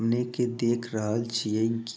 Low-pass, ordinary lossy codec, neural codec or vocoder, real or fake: none; none; none; real